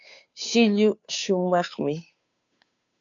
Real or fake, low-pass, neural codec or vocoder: fake; 7.2 kHz; codec, 16 kHz, 2 kbps, FunCodec, trained on Chinese and English, 25 frames a second